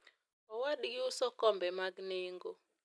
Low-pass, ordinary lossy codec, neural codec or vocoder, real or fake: none; none; none; real